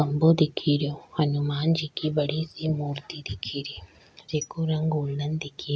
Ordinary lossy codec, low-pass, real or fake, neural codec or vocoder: none; none; real; none